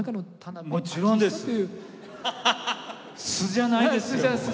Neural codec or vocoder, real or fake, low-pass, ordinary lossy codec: none; real; none; none